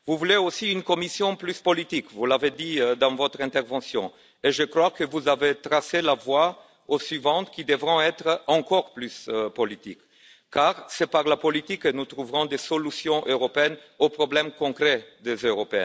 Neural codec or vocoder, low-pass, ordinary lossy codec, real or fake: none; none; none; real